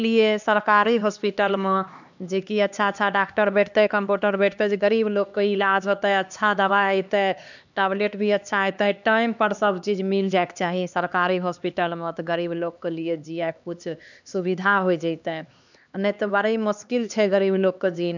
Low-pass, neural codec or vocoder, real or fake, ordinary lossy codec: 7.2 kHz; codec, 16 kHz, 2 kbps, X-Codec, HuBERT features, trained on LibriSpeech; fake; none